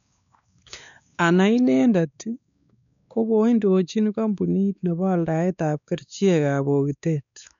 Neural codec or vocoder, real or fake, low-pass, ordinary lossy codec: codec, 16 kHz, 2 kbps, X-Codec, WavLM features, trained on Multilingual LibriSpeech; fake; 7.2 kHz; none